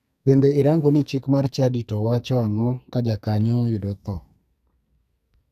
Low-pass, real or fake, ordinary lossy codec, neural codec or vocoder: 14.4 kHz; fake; none; codec, 44.1 kHz, 2.6 kbps, SNAC